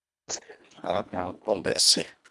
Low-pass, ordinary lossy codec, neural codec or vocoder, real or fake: none; none; codec, 24 kHz, 1.5 kbps, HILCodec; fake